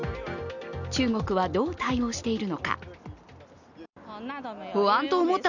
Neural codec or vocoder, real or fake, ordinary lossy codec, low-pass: none; real; none; 7.2 kHz